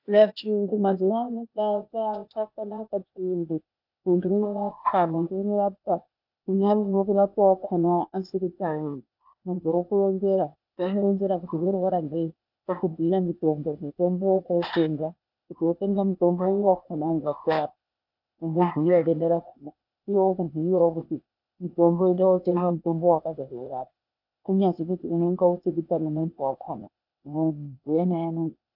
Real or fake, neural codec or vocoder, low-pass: fake; codec, 16 kHz, 0.8 kbps, ZipCodec; 5.4 kHz